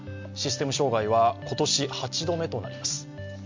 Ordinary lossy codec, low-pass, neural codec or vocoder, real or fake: MP3, 48 kbps; 7.2 kHz; none; real